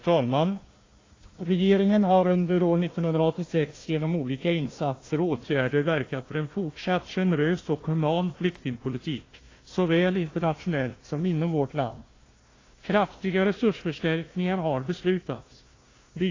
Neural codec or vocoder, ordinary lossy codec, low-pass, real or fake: codec, 16 kHz, 1 kbps, FunCodec, trained on Chinese and English, 50 frames a second; AAC, 32 kbps; 7.2 kHz; fake